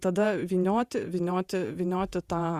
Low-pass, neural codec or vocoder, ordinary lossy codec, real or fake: 14.4 kHz; vocoder, 48 kHz, 128 mel bands, Vocos; Opus, 64 kbps; fake